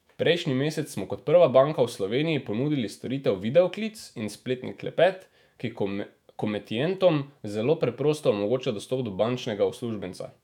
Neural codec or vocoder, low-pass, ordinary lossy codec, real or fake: autoencoder, 48 kHz, 128 numbers a frame, DAC-VAE, trained on Japanese speech; 19.8 kHz; none; fake